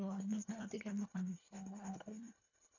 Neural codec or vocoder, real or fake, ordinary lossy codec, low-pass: codec, 24 kHz, 1.5 kbps, HILCodec; fake; none; 7.2 kHz